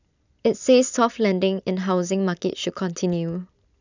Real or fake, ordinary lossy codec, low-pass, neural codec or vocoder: real; none; 7.2 kHz; none